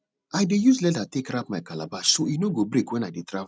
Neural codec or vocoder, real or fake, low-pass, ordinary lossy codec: none; real; none; none